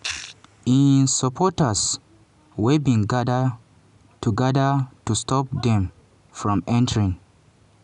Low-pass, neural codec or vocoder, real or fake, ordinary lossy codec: 10.8 kHz; none; real; none